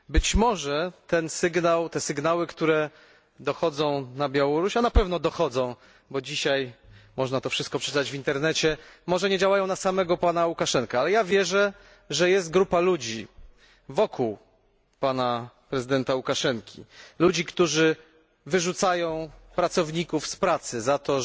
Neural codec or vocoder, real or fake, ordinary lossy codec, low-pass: none; real; none; none